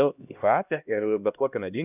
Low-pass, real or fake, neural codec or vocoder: 3.6 kHz; fake; codec, 16 kHz, 1 kbps, X-Codec, HuBERT features, trained on LibriSpeech